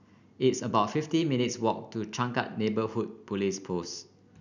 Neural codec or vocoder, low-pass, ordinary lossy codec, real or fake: none; 7.2 kHz; none; real